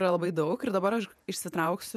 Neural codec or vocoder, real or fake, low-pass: vocoder, 48 kHz, 128 mel bands, Vocos; fake; 14.4 kHz